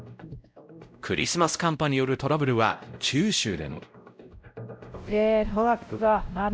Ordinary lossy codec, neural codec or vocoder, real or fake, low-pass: none; codec, 16 kHz, 0.5 kbps, X-Codec, WavLM features, trained on Multilingual LibriSpeech; fake; none